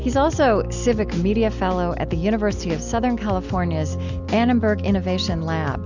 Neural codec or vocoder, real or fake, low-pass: none; real; 7.2 kHz